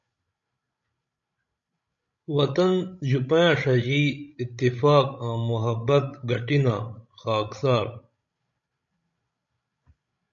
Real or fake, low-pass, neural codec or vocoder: fake; 7.2 kHz; codec, 16 kHz, 16 kbps, FreqCodec, larger model